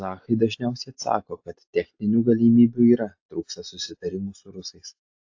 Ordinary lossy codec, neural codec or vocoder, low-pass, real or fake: AAC, 48 kbps; none; 7.2 kHz; real